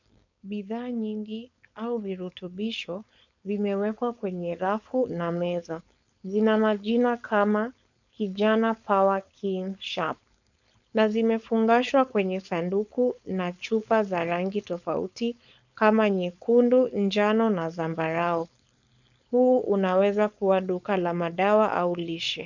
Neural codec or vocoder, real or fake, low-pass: codec, 16 kHz, 4.8 kbps, FACodec; fake; 7.2 kHz